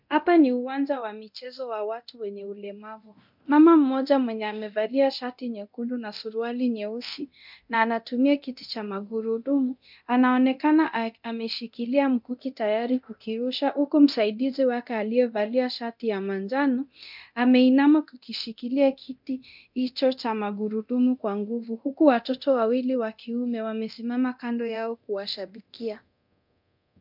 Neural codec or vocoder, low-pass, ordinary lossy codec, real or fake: codec, 24 kHz, 0.9 kbps, DualCodec; 5.4 kHz; MP3, 48 kbps; fake